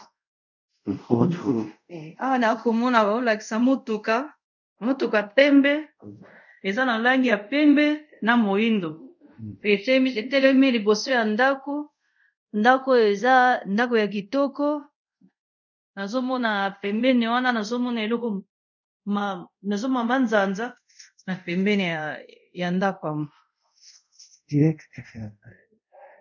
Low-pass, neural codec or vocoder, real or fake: 7.2 kHz; codec, 24 kHz, 0.5 kbps, DualCodec; fake